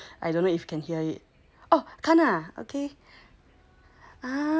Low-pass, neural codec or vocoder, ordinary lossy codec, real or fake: none; none; none; real